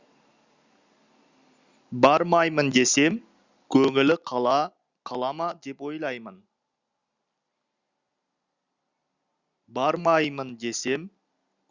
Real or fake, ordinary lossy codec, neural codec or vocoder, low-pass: real; Opus, 64 kbps; none; 7.2 kHz